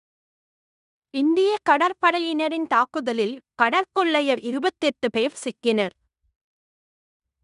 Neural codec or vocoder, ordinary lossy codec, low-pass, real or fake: codec, 16 kHz in and 24 kHz out, 0.9 kbps, LongCat-Audio-Codec, fine tuned four codebook decoder; none; 10.8 kHz; fake